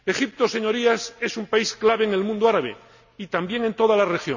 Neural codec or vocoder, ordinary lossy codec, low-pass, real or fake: none; none; 7.2 kHz; real